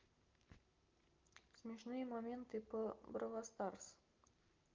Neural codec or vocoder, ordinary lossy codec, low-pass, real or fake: none; Opus, 32 kbps; 7.2 kHz; real